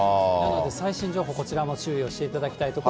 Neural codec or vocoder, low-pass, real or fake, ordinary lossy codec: none; none; real; none